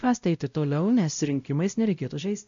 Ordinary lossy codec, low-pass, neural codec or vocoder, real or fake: MP3, 64 kbps; 7.2 kHz; codec, 16 kHz, 0.5 kbps, X-Codec, WavLM features, trained on Multilingual LibriSpeech; fake